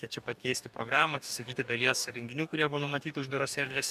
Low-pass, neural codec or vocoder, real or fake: 14.4 kHz; codec, 44.1 kHz, 2.6 kbps, DAC; fake